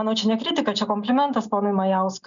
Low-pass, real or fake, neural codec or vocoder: 7.2 kHz; real; none